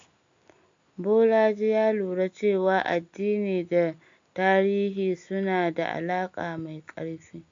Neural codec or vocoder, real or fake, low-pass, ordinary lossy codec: none; real; 7.2 kHz; AAC, 64 kbps